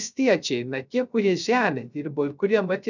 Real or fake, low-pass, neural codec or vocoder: fake; 7.2 kHz; codec, 16 kHz, 0.3 kbps, FocalCodec